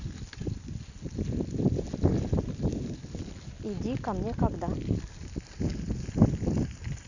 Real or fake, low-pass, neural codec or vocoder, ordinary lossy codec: real; 7.2 kHz; none; none